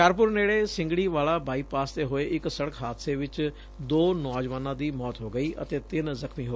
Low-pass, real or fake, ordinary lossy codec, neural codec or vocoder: none; real; none; none